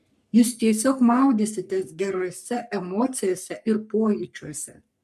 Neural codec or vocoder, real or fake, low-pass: codec, 44.1 kHz, 3.4 kbps, Pupu-Codec; fake; 14.4 kHz